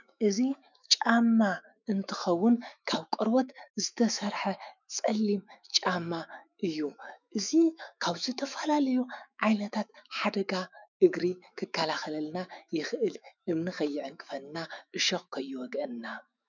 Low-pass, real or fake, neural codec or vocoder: 7.2 kHz; fake; autoencoder, 48 kHz, 128 numbers a frame, DAC-VAE, trained on Japanese speech